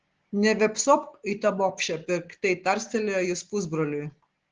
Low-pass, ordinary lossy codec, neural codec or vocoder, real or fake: 7.2 kHz; Opus, 16 kbps; none; real